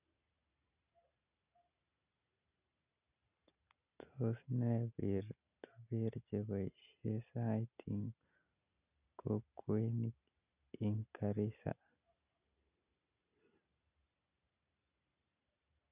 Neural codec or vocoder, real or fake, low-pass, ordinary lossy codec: none; real; 3.6 kHz; none